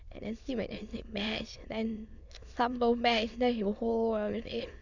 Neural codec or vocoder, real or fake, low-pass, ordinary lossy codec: autoencoder, 22.05 kHz, a latent of 192 numbers a frame, VITS, trained on many speakers; fake; 7.2 kHz; none